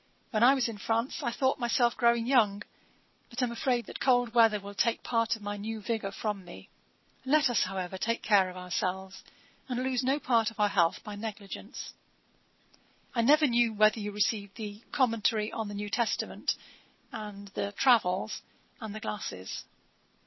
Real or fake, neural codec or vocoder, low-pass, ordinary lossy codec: real; none; 7.2 kHz; MP3, 24 kbps